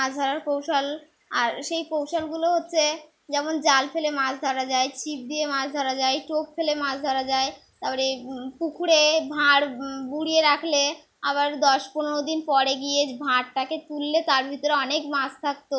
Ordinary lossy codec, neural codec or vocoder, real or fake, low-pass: none; none; real; none